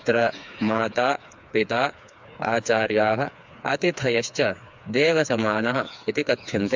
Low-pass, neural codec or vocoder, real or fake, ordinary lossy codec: 7.2 kHz; codec, 16 kHz, 4 kbps, FreqCodec, smaller model; fake; MP3, 64 kbps